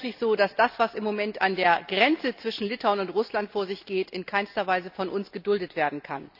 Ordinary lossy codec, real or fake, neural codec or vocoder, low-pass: none; real; none; 5.4 kHz